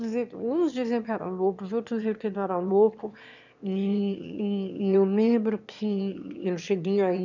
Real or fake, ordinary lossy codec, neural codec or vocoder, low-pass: fake; none; autoencoder, 22.05 kHz, a latent of 192 numbers a frame, VITS, trained on one speaker; 7.2 kHz